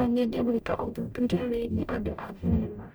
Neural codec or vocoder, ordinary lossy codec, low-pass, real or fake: codec, 44.1 kHz, 0.9 kbps, DAC; none; none; fake